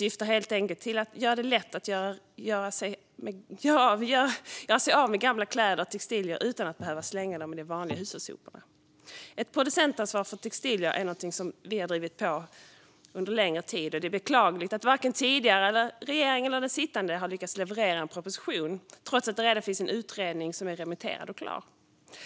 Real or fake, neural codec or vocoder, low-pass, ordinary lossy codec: real; none; none; none